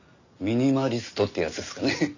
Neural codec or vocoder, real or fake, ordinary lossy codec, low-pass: none; real; none; 7.2 kHz